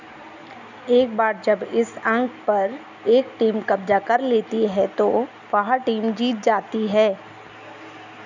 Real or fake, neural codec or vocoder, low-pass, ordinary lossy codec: real; none; 7.2 kHz; none